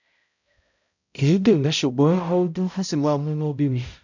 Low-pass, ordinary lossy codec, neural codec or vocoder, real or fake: 7.2 kHz; none; codec, 16 kHz, 0.5 kbps, X-Codec, HuBERT features, trained on balanced general audio; fake